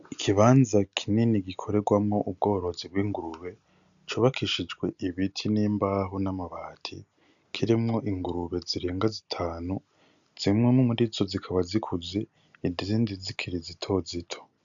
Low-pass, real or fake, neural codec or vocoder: 7.2 kHz; real; none